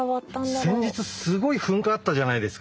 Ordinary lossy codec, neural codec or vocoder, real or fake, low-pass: none; none; real; none